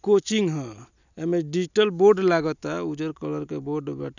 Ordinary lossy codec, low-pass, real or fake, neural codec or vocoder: none; 7.2 kHz; real; none